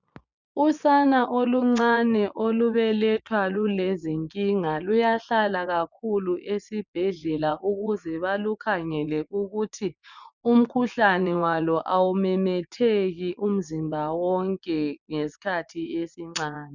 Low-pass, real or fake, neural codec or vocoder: 7.2 kHz; fake; vocoder, 24 kHz, 100 mel bands, Vocos